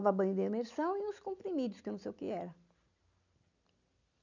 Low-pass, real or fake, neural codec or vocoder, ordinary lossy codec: 7.2 kHz; real; none; none